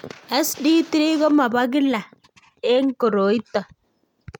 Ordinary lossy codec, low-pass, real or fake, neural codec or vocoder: MP3, 96 kbps; 19.8 kHz; real; none